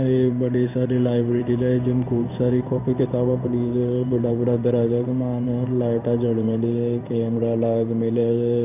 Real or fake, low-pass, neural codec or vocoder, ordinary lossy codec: fake; 3.6 kHz; codec, 16 kHz in and 24 kHz out, 1 kbps, XY-Tokenizer; none